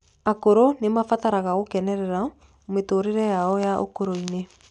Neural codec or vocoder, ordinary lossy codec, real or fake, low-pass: none; none; real; 10.8 kHz